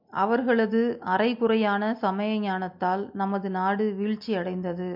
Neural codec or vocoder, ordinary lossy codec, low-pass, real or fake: none; MP3, 48 kbps; 5.4 kHz; real